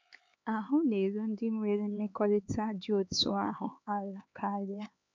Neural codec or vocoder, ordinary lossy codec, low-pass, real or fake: codec, 16 kHz, 4 kbps, X-Codec, HuBERT features, trained on LibriSpeech; MP3, 64 kbps; 7.2 kHz; fake